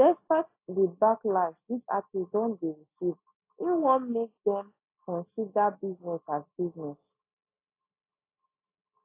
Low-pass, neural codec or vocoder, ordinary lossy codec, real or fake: 3.6 kHz; none; AAC, 24 kbps; real